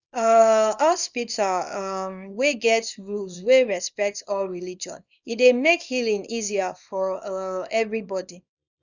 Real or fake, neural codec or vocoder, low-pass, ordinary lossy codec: fake; codec, 24 kHz, 0.9 kbps, WavTokenizer, small release; 7.2 kHz; none